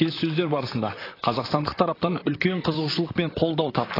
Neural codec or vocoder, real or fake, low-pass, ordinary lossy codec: vocoder, 44.1 kHz, 128 mel bands, Pupu-Vocoder; fake; 5.4 kHz; AAC, 24 kbps